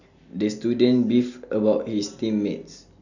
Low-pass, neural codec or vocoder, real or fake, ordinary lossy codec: 7.2 kHz; none; real; none